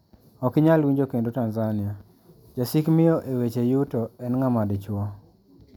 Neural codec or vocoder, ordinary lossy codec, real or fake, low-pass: none; none; real; 19.8 kHz